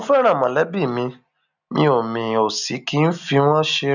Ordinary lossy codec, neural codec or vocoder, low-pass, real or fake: none; none; 7.2 kHz; real